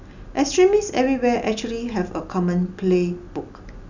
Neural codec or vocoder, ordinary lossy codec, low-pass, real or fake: none; none; 7.2 kHz; real